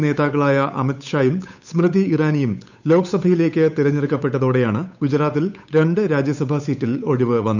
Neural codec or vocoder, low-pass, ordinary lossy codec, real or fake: codec, 16 kHz, 8 kbps, FunCodec, trained on Chinese and English, 25 frames a second; 7.2 kHz; none; fake